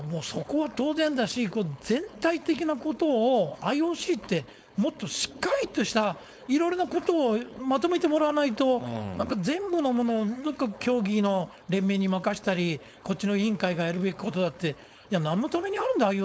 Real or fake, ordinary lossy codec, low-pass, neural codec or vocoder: fake; none; none; codec, 16 kHz, 4.8 kbps, FACodec